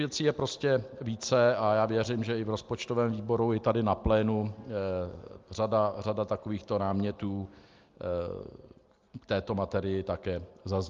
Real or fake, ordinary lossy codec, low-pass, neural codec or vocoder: real; Opus, 32 kbps; 7.2 kHz; none